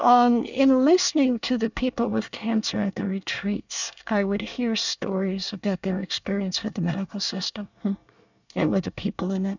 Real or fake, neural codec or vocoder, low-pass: fake; codec, 24 kHz, 1 kbps, SNAC; 7.2 kHz